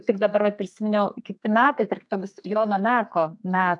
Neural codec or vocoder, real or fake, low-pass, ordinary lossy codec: codec, 32 kHz, 1.9 kbps, SNAC; fake; 10.8 kHz; AAC, 64 kbps